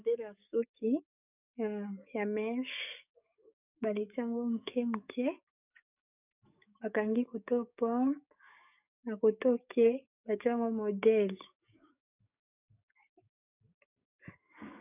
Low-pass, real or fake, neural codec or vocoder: 3.6 kHz; fake; codec, 44.1 kHz, 7.8 kbps, DAC